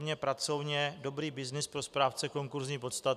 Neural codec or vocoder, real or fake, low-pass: none; real; 14.4 kHz